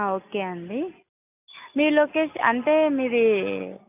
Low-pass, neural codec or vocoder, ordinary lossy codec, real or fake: 3.6 kHz; none; none; real